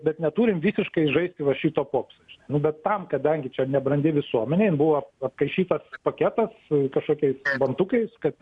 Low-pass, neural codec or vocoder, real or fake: 10.8 kHz; none; real